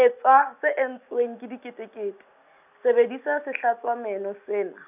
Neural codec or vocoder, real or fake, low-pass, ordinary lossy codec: none; real; 3.6 kHz; none